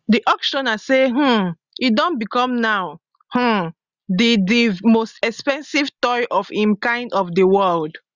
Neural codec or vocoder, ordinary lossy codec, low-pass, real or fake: none; Opus, 64 kbps; 7.2 kHz; real